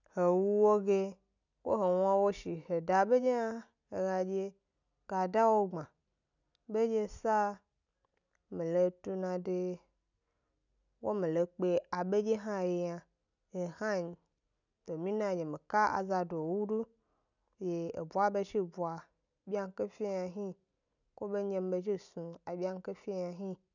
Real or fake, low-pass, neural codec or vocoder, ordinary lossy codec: real; 7.2 kHz; none; none